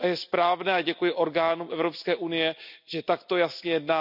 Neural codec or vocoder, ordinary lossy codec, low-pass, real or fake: none; none; 5.4 kHz; real